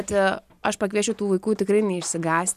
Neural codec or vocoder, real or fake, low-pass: none; real; 14.4 kHz